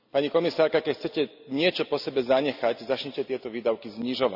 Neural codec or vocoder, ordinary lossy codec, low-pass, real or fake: none; none; 5.4 kHz; real